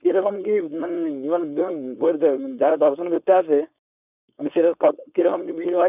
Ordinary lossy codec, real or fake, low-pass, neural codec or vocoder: none; fake; 3.6 kHz; codec, 16 kHz, 4.8 kbps, FACodec